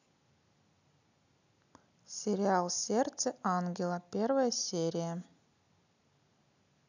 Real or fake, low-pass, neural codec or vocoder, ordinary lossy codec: real; 7.2 kHz; none; none